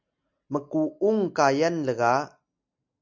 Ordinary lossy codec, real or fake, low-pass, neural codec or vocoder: MP3, 64 kbps; real; 7.2 kHz; none